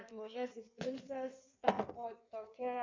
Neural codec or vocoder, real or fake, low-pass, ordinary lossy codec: codec, 16 kHz in and 24 kHz out, 1.1 kbps, FireRedTTS-2 codec; fake; 7.2 kHz; MP3, 64 kbps